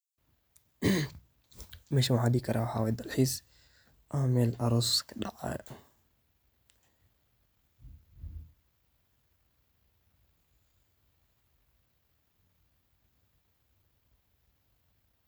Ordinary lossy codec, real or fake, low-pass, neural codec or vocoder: none; real; none; none